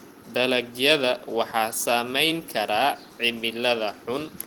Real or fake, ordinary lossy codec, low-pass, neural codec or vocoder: fake; Opus, 24 kbps; 19.8 kHz; vocoder, 44.1 kHz, 128 mel bands every 256 samples, BigVGAN v2